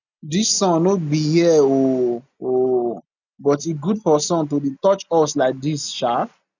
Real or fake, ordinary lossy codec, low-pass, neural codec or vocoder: real; none; 7.2 kHz; none